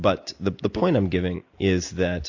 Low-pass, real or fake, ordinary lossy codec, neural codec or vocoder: 7.2 kHz; real; AAC, 48 kbps; none